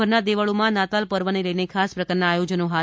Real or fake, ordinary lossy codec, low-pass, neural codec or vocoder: real; none; none; none